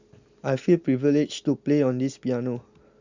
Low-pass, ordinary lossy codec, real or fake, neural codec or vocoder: 7.2 kHz; Opus, 64 kbps; real; none